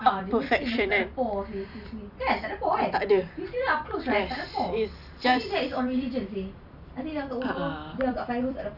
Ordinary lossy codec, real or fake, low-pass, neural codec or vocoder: none; fake; 5.4 kHz; vocoder, 44.1 kHz, 128 mel bands every 256 samples, BigVGAN v2